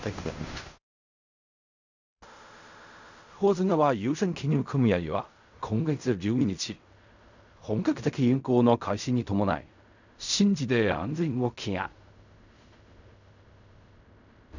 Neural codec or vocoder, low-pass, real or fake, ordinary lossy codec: codec, 16 kHz in and 24 kHz out, 0.4 kbps, LongCat-Audio-Codec, fine tuned four codebook decoder; 7.2 kHz; fake; none